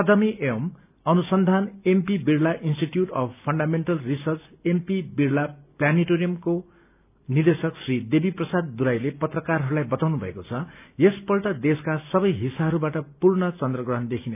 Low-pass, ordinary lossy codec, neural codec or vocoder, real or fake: 3.6 kHz; none; none; real